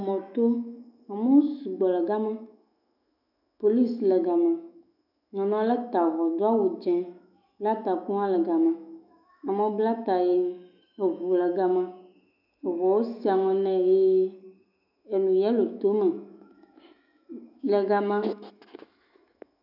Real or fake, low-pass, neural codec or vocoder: fake; 5.4 kHz; autoencoder, 48 kHz, 128 numbers a frame, DAC-VAE, trained on Japanese speech